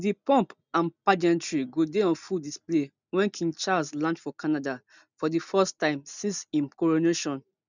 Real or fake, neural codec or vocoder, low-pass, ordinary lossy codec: real; none; 7.2 kHz; none